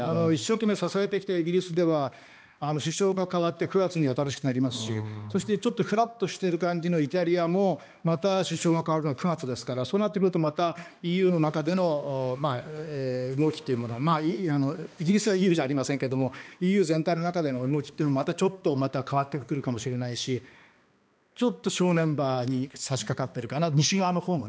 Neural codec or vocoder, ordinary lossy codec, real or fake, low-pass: codec, 16 kHz, 2 kbps, X-Codec, HuBERT features, trained on balanced general audio; none; fake; none